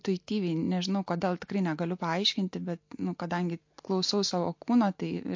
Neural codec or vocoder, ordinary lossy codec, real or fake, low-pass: none; MP3, 48 kbps; real; 7.2 kHz